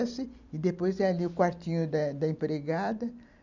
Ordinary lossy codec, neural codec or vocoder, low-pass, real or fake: none; none; 7.2 kHz; real